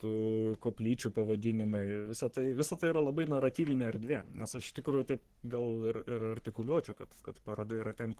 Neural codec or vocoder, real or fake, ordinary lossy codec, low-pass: codec, 44.1 kHz, 3.4 kbps, Pupu-Codec; fake; Opus, 16 kbps; 14.4 kHz